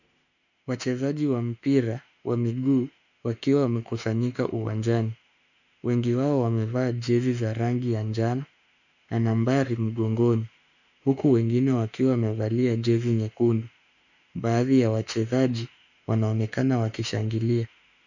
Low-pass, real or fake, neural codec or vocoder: 7.2 kHz; fake; autoencoder, 48 kHz, 32 numbers a frame, DAC-VAE, trained on Japanese speech